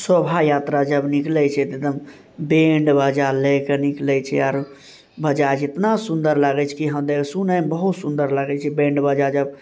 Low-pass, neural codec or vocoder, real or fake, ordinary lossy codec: none; none; real; none